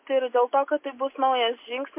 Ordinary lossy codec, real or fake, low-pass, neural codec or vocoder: MP3, 24 kbps; real; 3.6 kHz; none